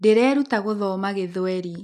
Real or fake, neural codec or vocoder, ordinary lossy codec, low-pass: real; none; none; 14.4 kHz